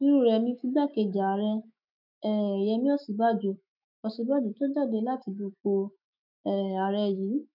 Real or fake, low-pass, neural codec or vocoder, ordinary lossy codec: fake; 5.4 kHz; autoencoder, 48 kHz, 128 numbers a frame, DAC-VAE, trained on Japanese speech; none